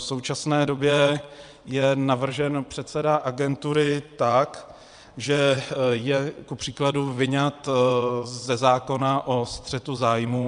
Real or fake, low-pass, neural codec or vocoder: fake; 9.9 kHz; vocoder, 22.05 kHz, 80 mel bands, WaveNeXt